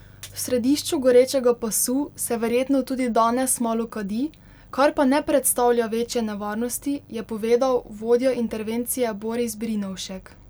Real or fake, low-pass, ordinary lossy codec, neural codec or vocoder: real; none; none; none